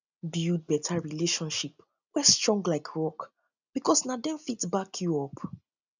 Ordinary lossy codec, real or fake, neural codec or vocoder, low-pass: none; real; none; 7.2 kHz